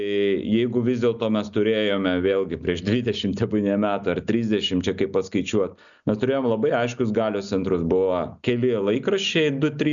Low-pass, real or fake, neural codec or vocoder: 7.2 kHz; real; none